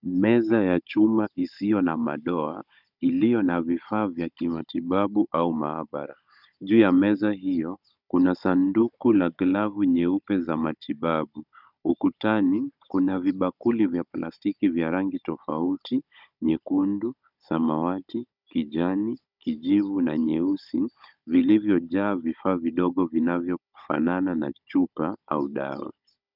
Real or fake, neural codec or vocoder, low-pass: fake; codec, 16 kHz, 16 kbps, FunCodec, trained on Chinese and English, 50 frames a second; 5.4 kHz